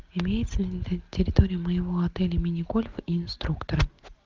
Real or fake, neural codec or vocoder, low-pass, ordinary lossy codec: real; none; 7.2 kHz; Opus, 32 kbps